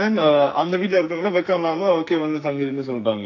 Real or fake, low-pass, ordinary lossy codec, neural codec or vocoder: fake; 7.2 kHz; AAC, 48 kbps; codec, 44.1 kHz, 2.6 kbps, SNAC